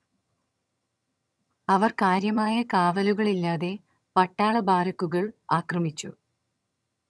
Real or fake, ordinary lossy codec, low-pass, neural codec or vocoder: fake; none; none; vocoder, 22.05 kHz, 80 mel bands, HiFi-GAN